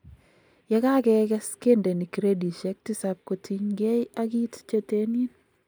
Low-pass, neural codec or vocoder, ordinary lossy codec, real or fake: none; none; none; real